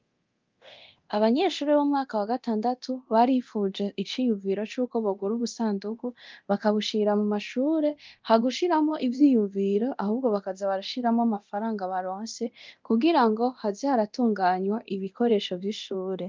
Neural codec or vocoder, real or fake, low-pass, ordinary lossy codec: codec, 24 kHz, 0.9 kbps, DualCodec; fake; 7.2 kHz; Opus, 32 kbps